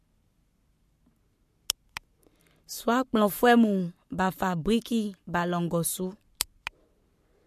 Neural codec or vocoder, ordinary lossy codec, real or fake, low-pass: codec, 44.1 kHz, 7.8 kbps, Pupu-Codec; MP3, 64 kbps; fake; 14.4 kHz